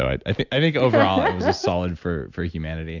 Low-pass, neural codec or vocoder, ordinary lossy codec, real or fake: 7.2 kHz; none; AAC, 48 kbps; real